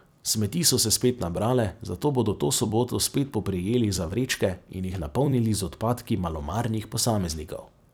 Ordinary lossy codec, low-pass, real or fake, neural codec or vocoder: none; none; fake; vocoder, 44.1 kHz, 128 mel bands every 256 samples, BigVGAN v2